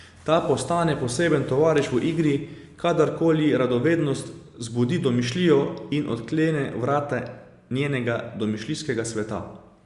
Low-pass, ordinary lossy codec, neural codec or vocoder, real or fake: 10.8 kHz; Opus, 64 kbps; none; real